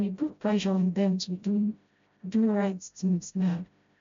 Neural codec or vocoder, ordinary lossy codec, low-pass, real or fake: codec, 16 kHz, 0.5 kbps, FreqCodec, smaller model; MP3, 64 kbps; 7.2 kHz; fake